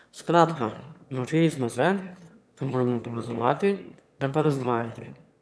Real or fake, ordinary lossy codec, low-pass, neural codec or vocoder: fake; none; none; autoencoder, 22.05 kHz, a latent of 192 numbers a frame, VITS, trained on one speaker